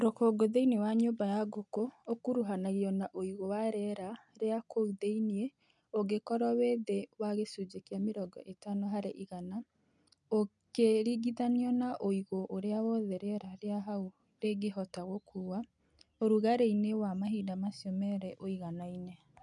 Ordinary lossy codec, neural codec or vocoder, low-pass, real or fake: none; none; 10.8 kHz; real